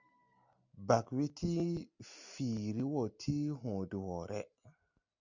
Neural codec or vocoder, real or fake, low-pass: none; real; 7.2 kHz